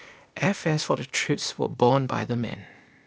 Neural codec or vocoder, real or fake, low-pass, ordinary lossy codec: codec, 16 kHz, 0.8 kbps, ZipCodec; fake; none; none